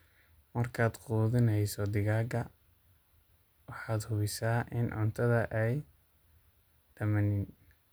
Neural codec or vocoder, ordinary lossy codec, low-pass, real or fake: none; none; none; real